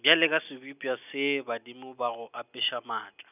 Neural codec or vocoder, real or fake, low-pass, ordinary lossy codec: none; real; 3.6 kHz; none